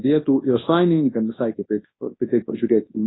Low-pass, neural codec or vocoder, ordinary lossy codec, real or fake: 7.2 kHz; codec, 24 kHz, 0.9 kbps, WavTokenizer, large speech release; AAC, 16 kbps; fake